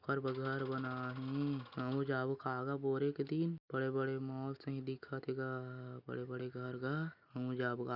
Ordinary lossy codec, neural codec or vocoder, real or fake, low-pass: none; none; real; 5.4 kHz